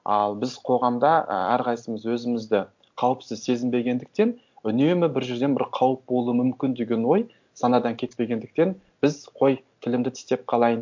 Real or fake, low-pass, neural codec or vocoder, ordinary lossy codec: real; 7.2 kHz; none; MP3, 64 kbps